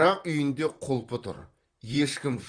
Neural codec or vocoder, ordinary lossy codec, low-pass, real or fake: vocoder, 44.1 kHz, 128 mel bands, Pupu-Vocoder; Opus, 32 kbps; 9.9 kHz; fake